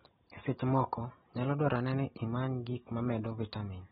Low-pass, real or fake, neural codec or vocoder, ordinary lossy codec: 10.8 kHz; real; none; AAC, 16 kbps